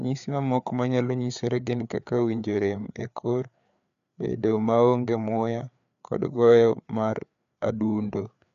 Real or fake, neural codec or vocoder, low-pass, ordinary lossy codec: fake; codec, 16 kHz, 4 kbps, FreqCodec, larger model; 7.2 kHz; none